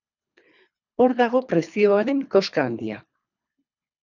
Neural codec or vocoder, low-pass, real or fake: codec, 24 kHz, 3 kbps, HILCodec; 7.2 kHz; fake